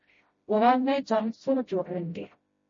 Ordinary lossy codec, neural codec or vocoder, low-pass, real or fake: MP3, 32 kbps; codec, 16 kHz, 0.5 kbps, FreqCodec, smaller model; 7.2 kHz; fake